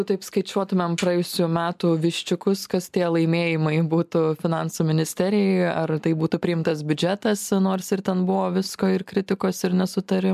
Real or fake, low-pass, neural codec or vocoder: real; 14.4 kHz; none